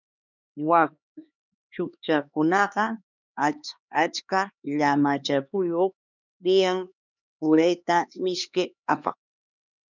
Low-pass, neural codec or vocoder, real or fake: 7.2 kHz; codec, 16 kHz, 2 kbps, X-Codec, HuBERT features, trained on LibriSpeech; fake